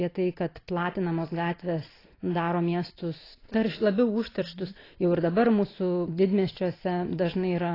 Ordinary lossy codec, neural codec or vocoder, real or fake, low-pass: AAC, 24 kbps; none; real; 5.4 kHz